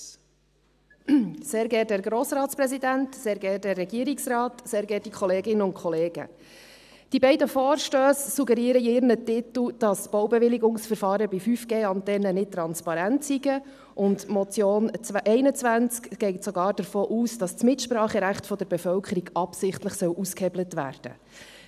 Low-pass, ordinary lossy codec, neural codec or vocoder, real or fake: 14.4 kHz; none; none; real